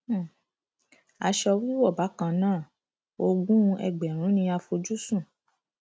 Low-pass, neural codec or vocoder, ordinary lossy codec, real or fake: none; none; none; real